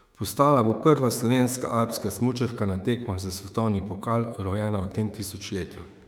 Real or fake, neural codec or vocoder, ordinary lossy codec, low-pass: fake; autoencoder, 48 kHz, 32 numbers a frame, DAC-VAE, trained on Japanese speech; none; 19.8 kHz